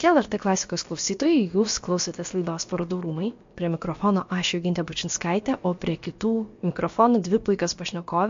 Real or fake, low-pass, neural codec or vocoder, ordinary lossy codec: fake; 7.2 kHz; codec, 16 kHz, about 1 kbps, DyCAST, with the encoder's durations; MP3, 48 kbps